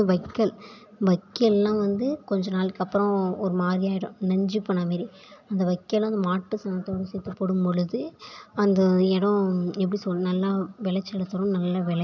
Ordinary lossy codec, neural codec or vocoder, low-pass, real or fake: none; none; 7.2 kHz; real